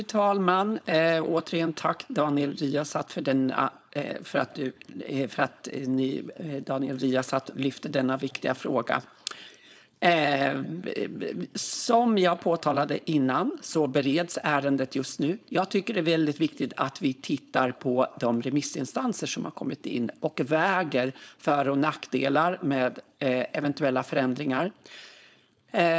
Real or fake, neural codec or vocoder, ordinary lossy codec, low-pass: fake; codec, 16 kHz, 4.8 kbps, FACodec; none; none